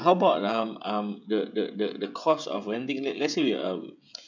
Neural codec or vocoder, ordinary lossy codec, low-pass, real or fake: codec, 16 kHz, 16 kbps, FreqCodec, smaller model; none; 7.2 kHz; fake